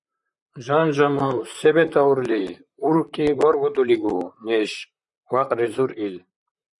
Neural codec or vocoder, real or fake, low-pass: vocoder, 44.1 kHz, 128 mel bands, Pupu-Vocoder; fake; 10.8 kHz